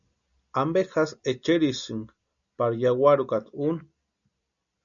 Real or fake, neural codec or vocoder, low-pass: real; none; 7.2 kHz